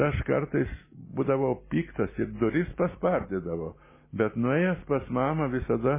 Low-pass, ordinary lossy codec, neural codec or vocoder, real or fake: 3.6 kHz; MP3, 16 kbps; none; real